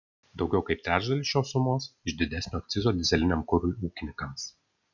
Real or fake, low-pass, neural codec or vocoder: real; 7.2 kHz; none